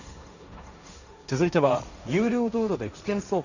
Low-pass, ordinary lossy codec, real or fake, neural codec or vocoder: 7.2 kHz; none; fake; codec, 16 kHz, 1.1 kbps, Voila-Tokenizer